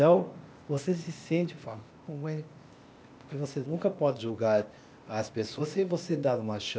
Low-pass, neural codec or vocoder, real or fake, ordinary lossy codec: none; codec, 16 kHz, 0.8 kbps, ZipCodec; fake; none